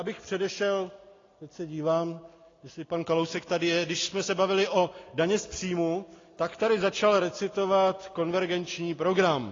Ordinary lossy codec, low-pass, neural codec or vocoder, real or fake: AAC, 32 kbps; 7.2 kHz; none; real